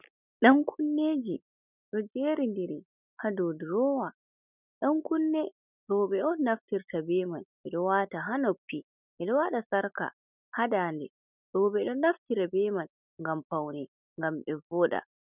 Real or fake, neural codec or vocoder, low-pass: real; none; 3.6 kHz